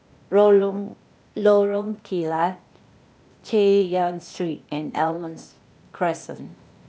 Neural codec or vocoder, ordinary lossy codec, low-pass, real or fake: codec, 16 kHz, 0.8 kbps, ZipCodec; none; none; fake